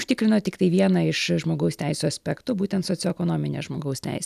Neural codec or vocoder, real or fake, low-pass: none; real; 14.4 kHz